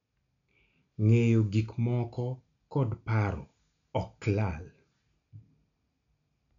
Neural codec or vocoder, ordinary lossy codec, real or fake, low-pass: none; none; real; 7.2 kHz